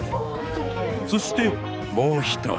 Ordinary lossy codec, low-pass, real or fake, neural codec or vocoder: none; none; fake; codec, 16 kHz, 4 kbps, X-Codec, HuBERT features, trained on balanced general audio